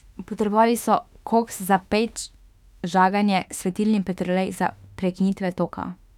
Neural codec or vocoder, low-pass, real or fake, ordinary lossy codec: autoencoder, 48 kHz, 32 numbers a frame, DAC-VAE, trained on Japanese speech; 19.8 kHz; fake; none